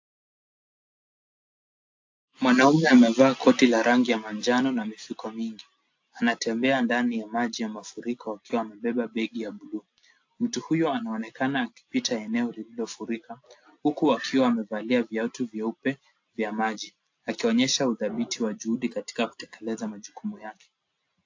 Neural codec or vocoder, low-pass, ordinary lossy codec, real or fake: none; 7.2 kHz; AAC, 48 kbps; real